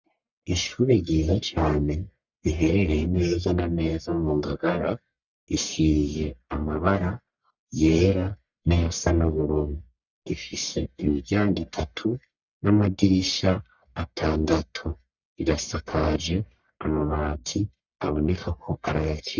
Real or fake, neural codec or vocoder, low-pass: fake; codec, 44.1 kHz, 1.7 kbps, Pupu-Codec; 7.2 kHz